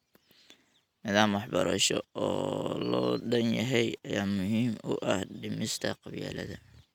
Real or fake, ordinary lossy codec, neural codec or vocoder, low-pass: real; none; none; 19.8 kHz